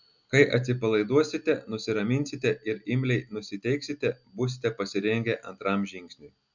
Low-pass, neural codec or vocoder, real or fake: 7.2 kHz; none; real